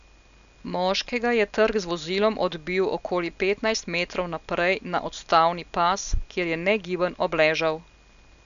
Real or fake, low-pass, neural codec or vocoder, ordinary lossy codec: real; 7.2 kHz; none; none